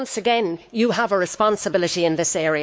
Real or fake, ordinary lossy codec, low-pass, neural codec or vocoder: fake; none; none; codec, 16 kHz, 4 kbps, X-Codec, WavLM features, trained on Multilingual LibriSpeech